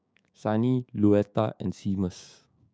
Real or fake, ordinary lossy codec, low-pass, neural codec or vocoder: fake; none; none; codec, 16 kHz, 6 kbps, DAC